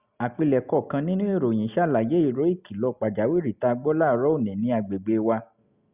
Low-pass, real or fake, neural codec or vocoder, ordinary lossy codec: 3.6 kHz; real; none; Opus, 64 kbps